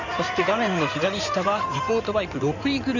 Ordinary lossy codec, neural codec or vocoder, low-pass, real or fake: none; codec, 16 kHz in and 24 kHz out, 2.2 kbps, FireRedTTS-2 codec; 7.2 kHz; fake